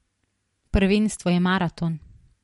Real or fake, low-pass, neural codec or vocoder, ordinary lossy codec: real; 19.8 kHz; none; MP3, 48 kbps